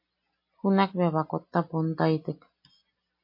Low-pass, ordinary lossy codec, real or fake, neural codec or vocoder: 5.4 kHz; MP3, 32 kbps; real; none